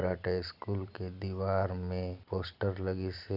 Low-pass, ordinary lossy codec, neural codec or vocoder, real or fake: 5.4 kHz; none; none; real